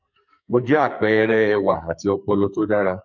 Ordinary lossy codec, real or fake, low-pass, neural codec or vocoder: none; fake; 7.2 kHz; codec, 44.1 kHz, 2.6 kbps, SNAC